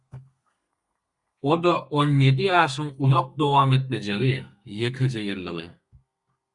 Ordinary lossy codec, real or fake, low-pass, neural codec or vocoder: Opus, 64 kbps; fake; 10.8 kHz; codec, 32 kHz, 1.9 kbps, SNAC